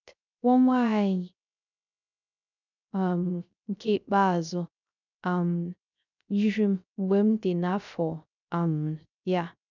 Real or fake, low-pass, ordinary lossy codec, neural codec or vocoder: fake; 7.2 kHz; none; codec, 16 kHz, 0.3 kbps, FocalCodec